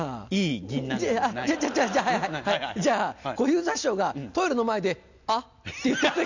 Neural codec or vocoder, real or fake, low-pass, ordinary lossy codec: none; real; 7.2 kHz; none